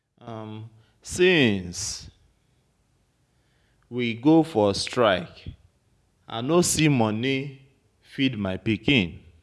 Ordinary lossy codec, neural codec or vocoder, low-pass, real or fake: none; none; none; real